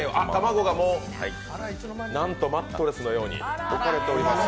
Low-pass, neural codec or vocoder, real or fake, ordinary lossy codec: none; none; real; none